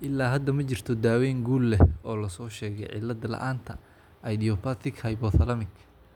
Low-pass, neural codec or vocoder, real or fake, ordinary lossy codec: 19.8 kHz; none; real; none